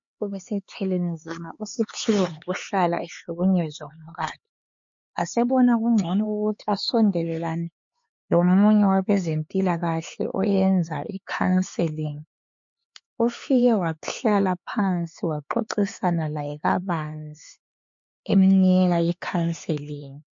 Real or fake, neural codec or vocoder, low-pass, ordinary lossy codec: fake; codec, 16 kHz, 4 kbps, X-Codec, HuBERT features, trained on LibriSpeech; 7.2 kHz; MP3, 48 kbps